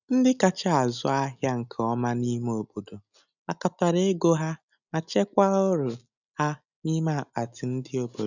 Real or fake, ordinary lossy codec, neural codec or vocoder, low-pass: real; none; none; 7.2 kHz